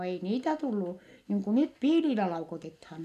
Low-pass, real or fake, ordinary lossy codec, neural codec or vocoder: 14.4 kHz; real; none; none